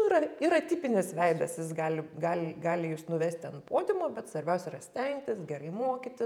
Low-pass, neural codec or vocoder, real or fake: 19.8 kHz; vocoder, 48 kHz, 128 mel bands, Vocos; fake